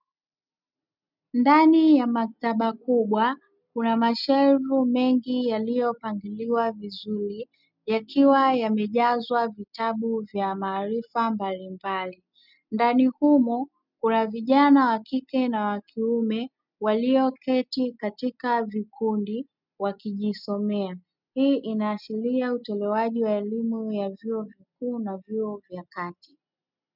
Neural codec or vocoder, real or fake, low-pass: none; real; 5.4 kHz